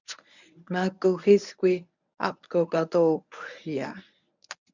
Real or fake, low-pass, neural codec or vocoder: fake; 7.2 kHz; codec, 24 kHz, 0.9 kbps, WavTokenizer, medium speech release version 1